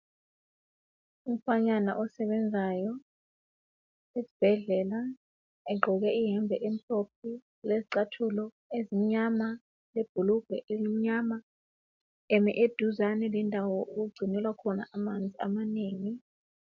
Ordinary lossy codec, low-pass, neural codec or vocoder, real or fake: MP3, 64 kbps; 7.2 kHz; none; real